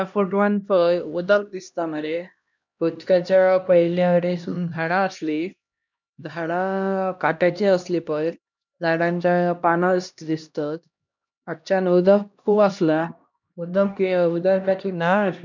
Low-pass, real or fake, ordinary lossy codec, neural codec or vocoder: 7.2 kHz; fake; none; codec, 16 kHz, 1 kbps, X-Codec, HuBERT features, trained on LibriSpeech